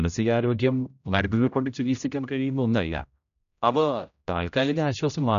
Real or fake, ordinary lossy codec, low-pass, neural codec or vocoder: fake; none; 7.2 kHz; codec, 16 kHz, 0.5 kbps, X-Codec, HuBERT features, trained on general audio